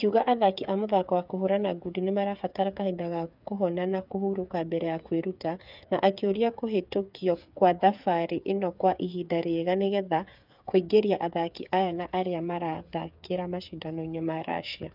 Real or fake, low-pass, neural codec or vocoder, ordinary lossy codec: fake; 5.4 kHz; codec, 16 kHz, 8 kbps, FreqCodec, smaller model; none